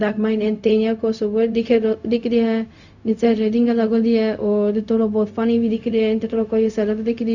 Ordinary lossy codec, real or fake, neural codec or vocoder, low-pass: none; fake; codec, 16 kHz, 0.4 kbps, LongCat-Audio-Codec; 7.2 kHz